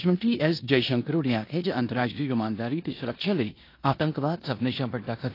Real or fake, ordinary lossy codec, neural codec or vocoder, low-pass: fake; AAC, 32 kbps; codec, 16 kHz in and 24 kHz out, 0.9 kbps, LongCat-Audio-Codec, four codebook decoder; 5.4 kHz